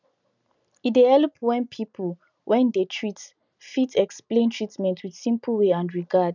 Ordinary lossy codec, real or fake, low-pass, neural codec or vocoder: none; real; 7.2 kHz; none